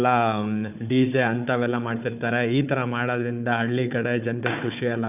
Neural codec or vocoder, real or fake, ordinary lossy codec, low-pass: codec, 16 kHz, 4 kbps, FunCodec, trained on Chinese and English, 50 frames a second; fake; none; 3.6 kHz